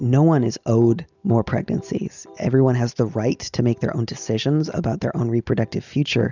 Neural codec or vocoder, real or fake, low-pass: none; real; 7.2 kHz